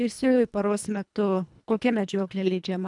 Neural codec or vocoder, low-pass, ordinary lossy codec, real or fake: codec, 24 kHz, 1.5 kbps, HILCodec; 10.8 kHz; MP3, 96 kbps; fake